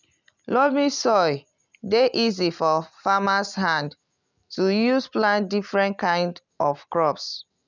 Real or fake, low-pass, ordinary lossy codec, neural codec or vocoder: real; 7.2 kHz; none; none